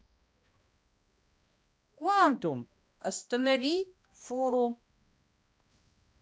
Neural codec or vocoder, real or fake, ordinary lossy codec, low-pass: codec, 16 kHz, 1 kbps, X-Codec, HuBERT features, trained on balanced general audio; fake; none; none